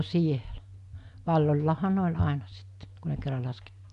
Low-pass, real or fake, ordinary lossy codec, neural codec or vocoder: 10.8 kHz; real; AAC, 96 kbps; none